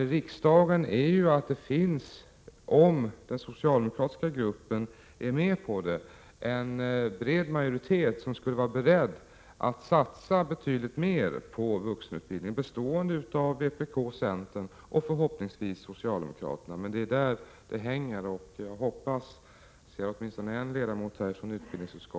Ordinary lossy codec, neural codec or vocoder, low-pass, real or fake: none; none; none; real